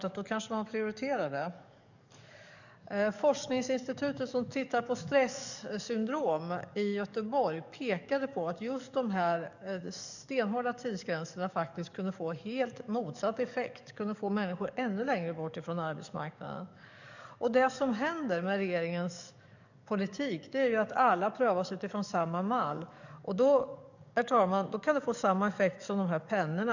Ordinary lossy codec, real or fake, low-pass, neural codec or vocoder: none; fake; 7.2 kHz; codec, 44.1 kHz, 7.8 kbps, DAC